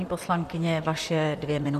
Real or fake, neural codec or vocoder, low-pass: fake; vocoder, 44.1 kHz, 128 mel bands, Pupu-Vocoder; 14.4 kHz